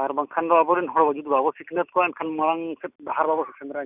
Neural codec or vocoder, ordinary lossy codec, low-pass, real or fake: none; none; 3.6 kHz; real